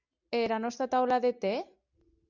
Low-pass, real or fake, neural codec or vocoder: 7.2 kHz; real; none